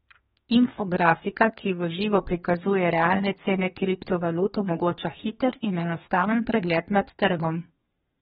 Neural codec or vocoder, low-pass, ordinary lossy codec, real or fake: codec, 32 kHz, 1.9 kbps, SNAC; 14.4 kHz; AAC, 16 kbps; fake